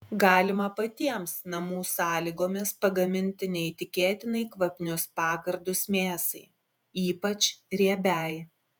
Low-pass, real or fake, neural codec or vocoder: 19.8 kHz; fake; vocoder, 48 kHz, 128 mel bands, Vocos